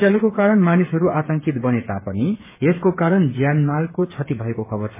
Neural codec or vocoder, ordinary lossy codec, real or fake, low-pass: codec, 16 kHz, 8 kbps, FreqCodec, smaller model; MP3, 16 kbps; fake; 3.6 kHz